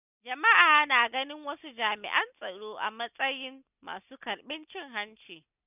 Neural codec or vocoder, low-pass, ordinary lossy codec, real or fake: none; 3.6 kHz; none; real